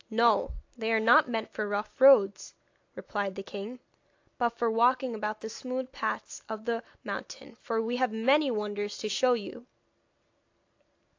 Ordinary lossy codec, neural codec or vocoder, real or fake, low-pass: AAC, 48 kbps; none; real; 7.2 kHz